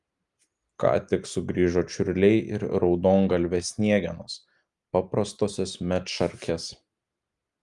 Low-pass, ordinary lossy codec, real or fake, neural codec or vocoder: 10.8 kHz; Opus, 32 kbps; real; none